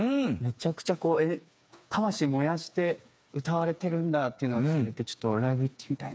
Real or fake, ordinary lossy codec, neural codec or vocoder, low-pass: fake; none; codec, 16 kHz, 4 kbps, FreqCodec, smaller model; none